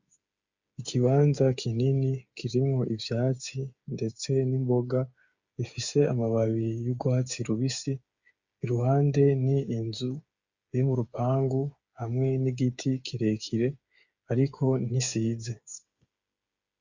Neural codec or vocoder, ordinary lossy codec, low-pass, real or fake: codec, 16 kHz, 8 kbps, FreqCodec, smaller model; Opus, 64 kbps; 7.2 kHz; fake